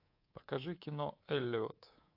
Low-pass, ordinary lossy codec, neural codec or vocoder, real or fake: 5.4 kHz; AAC, 32 kbps; codec, 24 kHz, 3.1 kbps, DualCodec; fake